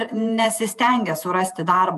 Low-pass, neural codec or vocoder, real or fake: 14.4 kHz; vocoder, 48 kHz, 128 mel bands, Vocos; fake